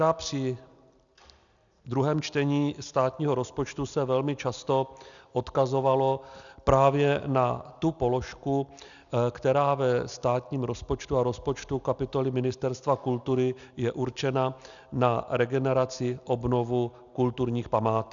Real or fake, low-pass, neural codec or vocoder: real; 7.2 kHz; none